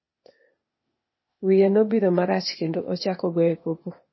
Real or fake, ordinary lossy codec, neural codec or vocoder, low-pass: fake; MP3, 24 kbps; codec, 16 kHz, 0.8 kbps, ZipCodec; 7.2 kHz